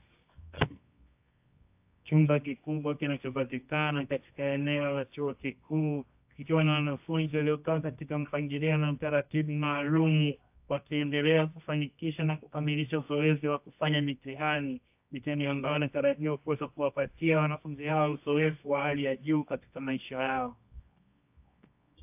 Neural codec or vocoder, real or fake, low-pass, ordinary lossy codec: codec, 24 kHz, 0.9 kbps, WavTokenizer, medium music audio release; fake; 3.6 kHz; AAC, 32 kbps